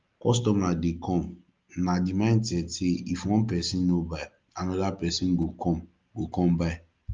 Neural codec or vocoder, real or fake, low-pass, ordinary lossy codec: none; real; 7.2 kHz; Opus, 32 kbps